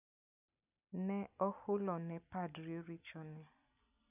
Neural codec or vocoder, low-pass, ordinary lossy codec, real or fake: none; 3.6 kHz; none; real